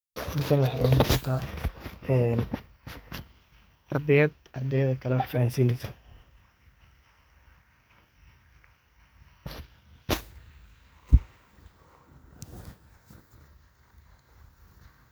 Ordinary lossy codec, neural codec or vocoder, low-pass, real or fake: none; codec, 44.1 kHz, 2.6 kbps, SNAC; none; fake